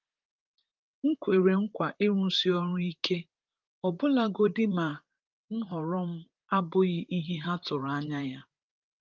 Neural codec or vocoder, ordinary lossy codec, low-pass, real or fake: vocoder, 44.1 kHz, 128 mel bands, Pupu-Vocoder; Opus, 24 kbps; 7.2 kHz; fake